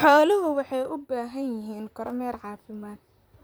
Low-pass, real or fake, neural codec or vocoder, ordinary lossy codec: none; fake; vocoder, 44.1 kHz, 128 mel bands, Pupu-Vocoder; none